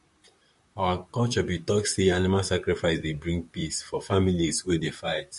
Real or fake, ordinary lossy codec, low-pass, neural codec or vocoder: fake; MP3, 48 kbps; 14.4 kHz; vocoder, 44.1 kHz, 128 mel bands, Pupu-Vocoder